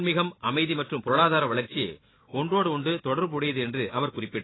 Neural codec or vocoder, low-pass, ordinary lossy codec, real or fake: none; 7.2 kHz; AAC, 16 kbps; real